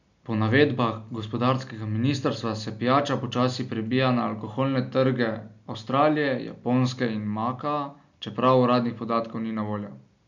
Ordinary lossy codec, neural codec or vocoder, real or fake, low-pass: none; none; real; 7.2 kHz